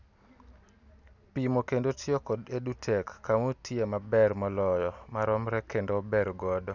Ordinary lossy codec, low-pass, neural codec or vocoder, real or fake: none; 7.2 kHz; none; real